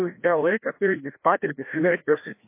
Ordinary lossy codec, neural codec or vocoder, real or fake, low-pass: MP3, 24 kbps; codec, 16 kHz, 1 kbps, FreqCodec, larger model; fake; 3.6 kHz